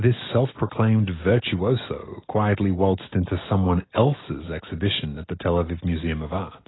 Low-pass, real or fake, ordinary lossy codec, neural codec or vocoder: 7.2 kHz; real; AAC, 16 kbps; none